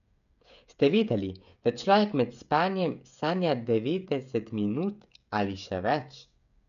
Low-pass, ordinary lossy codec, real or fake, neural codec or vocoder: 7.2 kHz; none; fake; codec, 16 kHz, 16 kbps, FreqCodec, smaller model